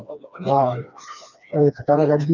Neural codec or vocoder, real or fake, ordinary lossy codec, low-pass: codec, 16 kHz, 2 kbps, FreqCodec, smaller model; fake; none; 7.2 kHz